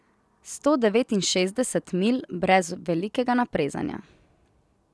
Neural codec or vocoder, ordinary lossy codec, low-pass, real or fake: none; none; none; real